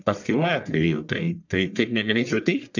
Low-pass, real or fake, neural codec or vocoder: 7.2 kHz; fake; codec, 44.1 kHz, 1.7 kbps, Pupu-Codec